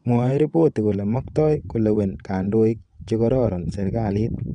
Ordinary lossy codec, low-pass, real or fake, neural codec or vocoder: MP3, 96 kbps; 9.9 kHz; fake; vocoder, 22.05 kHz, 80 mel bands, WaveNeXt